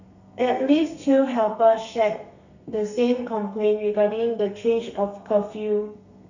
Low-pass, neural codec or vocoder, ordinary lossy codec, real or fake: 7.2 kHz; codec, 32 kHz, 1.9 kbps, SNAC; none; fake